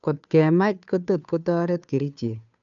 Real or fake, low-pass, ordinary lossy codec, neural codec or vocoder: fake; 7.2 kHz; none; codec, 16 kHz, 2 kbps, FunCodec, trained on Chinese and English, 25 frames a second